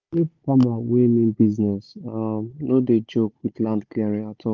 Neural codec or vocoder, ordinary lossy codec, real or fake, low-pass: codec, 16 kHz, 16 kbps, FunCodec, trained on Chinese and English, 50 frames a second; Opus, 32 kbps; fake; 7.2 kHz